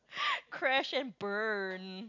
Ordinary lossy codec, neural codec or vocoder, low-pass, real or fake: none; none; 7.2 kHz; real